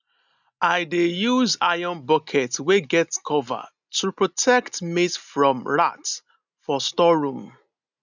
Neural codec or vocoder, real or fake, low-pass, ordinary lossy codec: none; real; 7.2 kHz; none